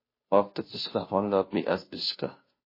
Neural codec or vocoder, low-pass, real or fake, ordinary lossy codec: codec, 16 kHz, 0.5 kbps, FunCodec, trained on Chinese and English, 25 frames a second; 5.4 kHz; fake; MP3, 24 kbps